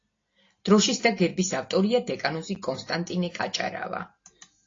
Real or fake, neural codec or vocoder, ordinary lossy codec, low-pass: real; none; AAC, 32 kbps; 7.2 kHz